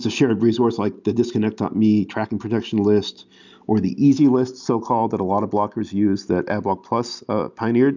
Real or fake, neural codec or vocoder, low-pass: real; none; 7.2 kHz